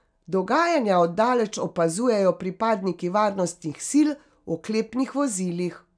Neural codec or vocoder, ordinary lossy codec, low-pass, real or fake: none; none; 9.9 kHz; real